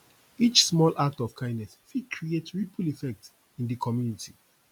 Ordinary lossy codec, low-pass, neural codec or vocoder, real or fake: none; 19.8 kHz; none; real